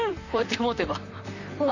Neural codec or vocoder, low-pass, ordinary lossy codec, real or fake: vocoder, 44.1 kHz, 128 mel bands, Pupu-Vocoder; 7.2 kHz; none; fake